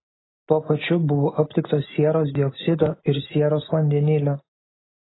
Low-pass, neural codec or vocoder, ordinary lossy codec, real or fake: 7.2 kHz; none; AAC, 16 kbps; real